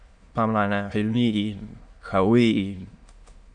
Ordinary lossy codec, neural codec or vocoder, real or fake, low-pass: Opus, 64 kbps; autoencoder, 22.05 kHz, a latent of 192 numbers a frame, VITS, trained on many speakers; fake; 9.9 kHz